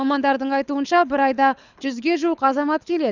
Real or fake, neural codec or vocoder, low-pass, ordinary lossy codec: fake; codec, 24 kHz, 6 kbps, HILCodec; 7.2 kHz; none